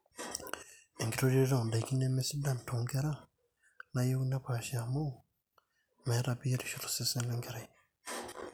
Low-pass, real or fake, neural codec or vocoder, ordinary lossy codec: none; real; none; none